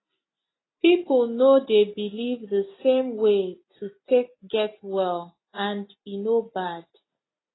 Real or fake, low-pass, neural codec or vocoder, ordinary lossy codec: real; 7.2 kHz; none; AAC, 16 kbps